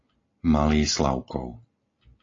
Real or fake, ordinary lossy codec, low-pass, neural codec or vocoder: real; AAC, 32 kbps; 7.2 kHz; none